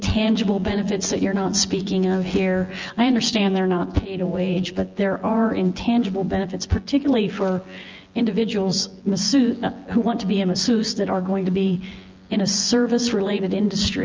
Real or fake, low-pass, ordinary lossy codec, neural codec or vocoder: fake; 7.2 kHz; Opus, 32 kbps; vocoder, 24 kHz, 100 mel bands, Vocos